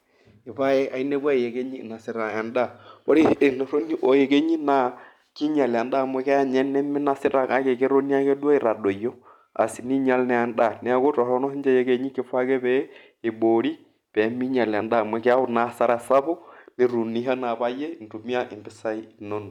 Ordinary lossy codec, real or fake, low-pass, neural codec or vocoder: none; real; 19.8 kHz; none